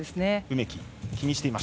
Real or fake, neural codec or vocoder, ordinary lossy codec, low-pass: real; none; none; none